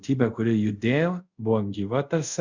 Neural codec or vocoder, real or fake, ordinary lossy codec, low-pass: codec, 24 kHz, 0.5 kbps, DualCodec; fake; Opus, 64 kbps; 7.2 kHz